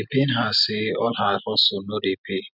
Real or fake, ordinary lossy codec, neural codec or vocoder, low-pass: real; none; none; 5.4 kHz